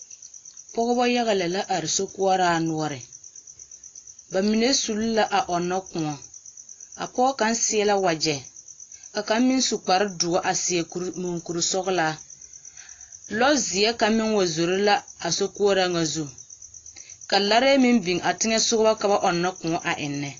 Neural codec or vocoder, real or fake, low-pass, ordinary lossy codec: none; real; 7.2 kHz; AAC, 32 kbps